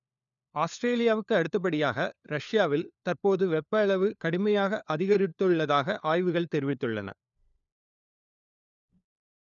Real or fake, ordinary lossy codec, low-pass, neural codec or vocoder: fake; none; 7.2 kHz; codec, 16 kHz, 4 kbps, FunCodec, trained on LibriTTS, 50 frames a second